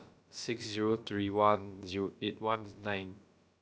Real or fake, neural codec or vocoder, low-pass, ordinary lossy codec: fake; codec, 16 kHz, about 1 kbps, DyCAST, with the encoder's durations; none; none